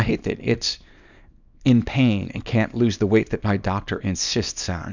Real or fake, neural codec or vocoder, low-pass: fake; codec, 24 kHz, 0.9 kbps, WavTokenizer, small release; 7.2 kHz